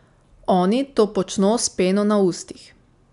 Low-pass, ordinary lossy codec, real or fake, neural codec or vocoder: 10.8 kHz; none; real; none